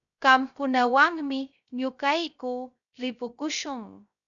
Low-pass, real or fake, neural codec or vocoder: 7.2 kHz; fake; codec, 16 kHz, 0.3 kbps, FocalCodec